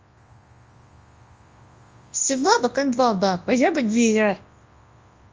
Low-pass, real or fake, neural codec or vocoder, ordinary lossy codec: 7.2 kHz; fake; codec, 24 kHz, 0.9 kbps, WavTokenizer, large speech release; Opus, 24 kbps